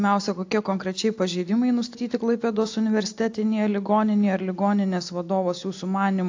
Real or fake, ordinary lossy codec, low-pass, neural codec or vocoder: real; AAC, 48 kbps; 7.2 kHz; none